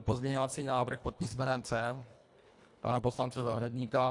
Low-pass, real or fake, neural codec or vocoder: 10.8 kHz; fake; codec, 24 kHz, 1.5 kbps, HILCodec